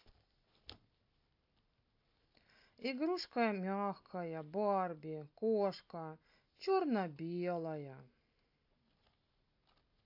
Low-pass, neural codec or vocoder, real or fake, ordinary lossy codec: 5.4 kHz; none; real; AAC, 48 kbps